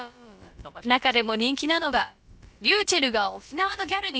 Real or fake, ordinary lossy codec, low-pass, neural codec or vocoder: fake; none; none; codec, 16 kHz, about 1 kbps, DyCAST, with the encoder's durations